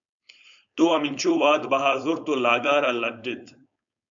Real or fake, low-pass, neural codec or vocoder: fake; 7.2 kHz; codec, 16 kHz, 4.8 kbps, FACodec